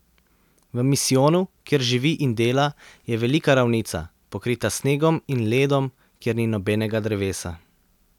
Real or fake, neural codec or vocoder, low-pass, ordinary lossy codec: real; none; 19.8 kHz; none